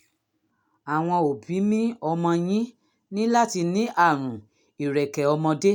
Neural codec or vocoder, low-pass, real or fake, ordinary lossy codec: none; 19.8 kHz; real; none